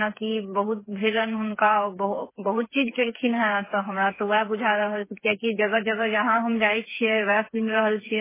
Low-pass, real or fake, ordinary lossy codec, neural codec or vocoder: 3.6 kHz; fake; MP3, 16 kbps; codec, 16 kHz, 4 kbps, FreqCodec, smaller model